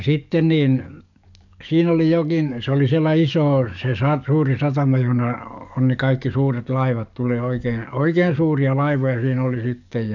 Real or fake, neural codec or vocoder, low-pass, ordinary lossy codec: fake; codec, 44.1 kHz, 7.8 kbps, DAC; 7.2 kHz; none